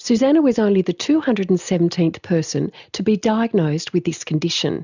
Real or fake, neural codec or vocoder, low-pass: real; none; 7.2 kHz